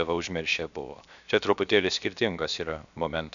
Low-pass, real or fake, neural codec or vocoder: 7.2 kHz; fake; codec, 16 kHz, 0.7 kbps, FocalCodec